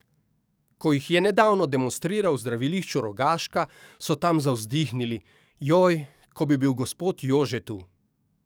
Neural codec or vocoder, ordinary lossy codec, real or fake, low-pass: codec, 44.1 kHz, 7.8 kbps, DAC; none; fake; none